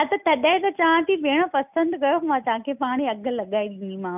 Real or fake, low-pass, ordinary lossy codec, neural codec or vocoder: real; 3.6 kHz; none; none